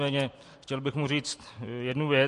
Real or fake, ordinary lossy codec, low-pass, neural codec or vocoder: fake; MP3, 48 kbps; 14.4 kHz; vocoder, 44.1 kHz, 128 mel bands every 256 samples, BigVGAN v2